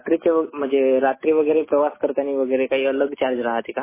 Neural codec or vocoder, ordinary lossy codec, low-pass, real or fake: none; MP3, 16 kbps; 3.6 kHz; real